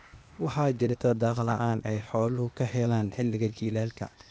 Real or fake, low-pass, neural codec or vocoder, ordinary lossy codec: fake; none; codec, 16 kHz, 0.8 kbps, ZipCodec; none